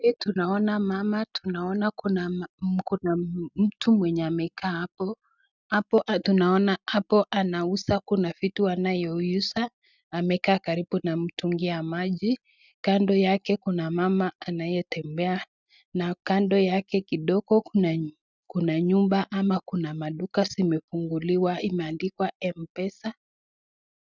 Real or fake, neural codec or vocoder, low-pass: real; none; 7.2 kHz